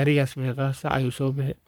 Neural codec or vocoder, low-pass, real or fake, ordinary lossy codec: codec, 44.1 kHz, 3.4 kbps, Pupu-Codec; none; fake; none